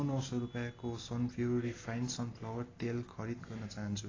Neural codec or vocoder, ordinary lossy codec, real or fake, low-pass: none; AAC, 32 kbps; real; 7.2 kHz